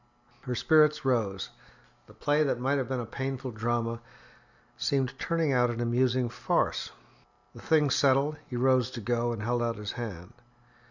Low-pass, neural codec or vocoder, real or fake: 7.2 kHz; none; real